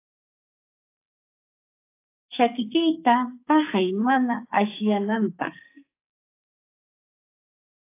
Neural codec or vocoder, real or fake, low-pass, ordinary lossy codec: codec, 44.1 kHz, 2.6 kbps, SNAC; fake; 3.6 kHz; AAC, 24 kbps